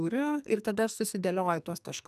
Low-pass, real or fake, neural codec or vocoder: 14.4 kHz; fake; codec, 44.1 kHz, 2.6 kbps, SNAC